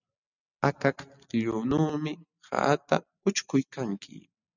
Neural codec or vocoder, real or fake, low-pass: none; real; 7.2 kHz